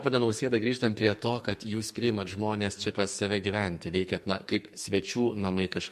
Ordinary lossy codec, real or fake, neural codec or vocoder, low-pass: MP3, 64 kbps; fake; codec, 44.1 kHz, 2.6 kbps, SNAC; 14.4 kHz